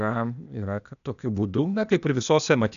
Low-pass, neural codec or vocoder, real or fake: 7.2 kHz; codec, 16 kHz, 0.8 kbps, ZipCodec; fake